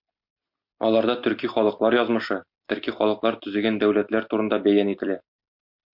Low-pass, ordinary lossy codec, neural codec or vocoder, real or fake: 5.4 kHz; MP3, 48 kbps; none; real